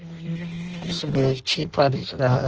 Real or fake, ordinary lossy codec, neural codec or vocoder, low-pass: fake; Opus, 16 kbps; codec, 16 kHz in and 24 kHz out, 0.6 kbps, FireRedTTS-2 codec; 7.2 kHz